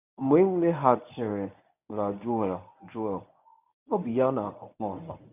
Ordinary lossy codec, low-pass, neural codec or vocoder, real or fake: none; 3.6 kHz; codec, 24 kHz, 0.9 kbps, WavTokenizer, medium speech release version 1; fake